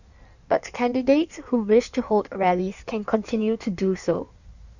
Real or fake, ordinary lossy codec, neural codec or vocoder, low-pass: fake; none; codec, 16 kHz in and 24 kHz out, 1.1 kbps, FireRedTTS-2 codec; 7.2 kHz